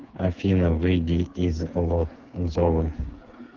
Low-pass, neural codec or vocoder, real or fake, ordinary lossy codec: 7.2 kHz; codec, 16 kHz, 4 kbps, FreqCodec, smaller model; fake; Opus, 32 kbps